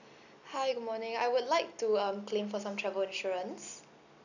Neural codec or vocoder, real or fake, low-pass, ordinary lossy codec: none; real; 7.2 kHz; none